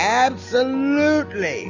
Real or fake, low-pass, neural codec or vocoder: real; 7.2 kHz; none